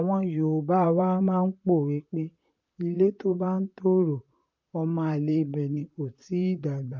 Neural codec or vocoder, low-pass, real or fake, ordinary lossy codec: vocoder, 44.1 kHz, 128 mel bands, Pupu-Vocoder; 7.2 kHz; fake; MP3, 48 kbps